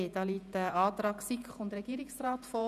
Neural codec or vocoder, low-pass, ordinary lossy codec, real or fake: vocoder, 48 kHz, 128 mel bands, Vocos; 14.4 kHz; none; fake